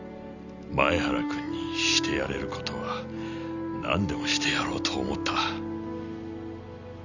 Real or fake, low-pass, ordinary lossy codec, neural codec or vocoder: real; 7.2 kHz; none; none